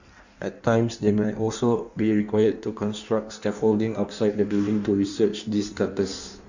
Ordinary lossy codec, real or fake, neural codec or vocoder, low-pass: none; fake; codec, 16 kHz in and 24 kHz out, 1.1 kbps, FireRedTTS-2 codec; 7.2 kHz